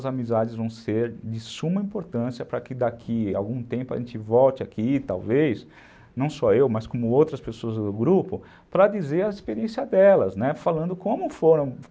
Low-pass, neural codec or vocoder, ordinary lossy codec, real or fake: none; none; none; real